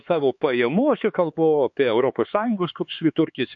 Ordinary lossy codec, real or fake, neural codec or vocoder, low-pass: MP3, 48 kbps; fake; codec, 16 kHz, 4 kbps, X-Codec, HuBERT features, trained on LibriSpeech; 7.2 kHz